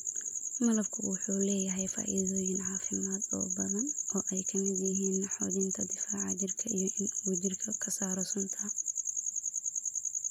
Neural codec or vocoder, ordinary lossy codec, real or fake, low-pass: none; none; real; 19.8 kHz